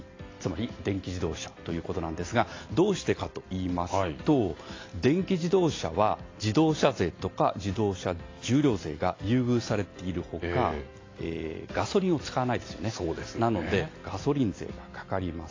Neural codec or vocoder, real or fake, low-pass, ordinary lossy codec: none; real; 7.2 kHz; AAC, 32 kbps